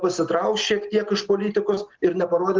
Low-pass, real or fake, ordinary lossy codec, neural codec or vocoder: 7.2 kHz; real; Opus, 16 kbps; none